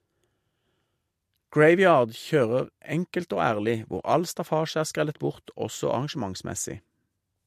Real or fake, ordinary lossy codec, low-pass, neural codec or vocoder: real; MP3, 64 kbps; 14.4 kHz; none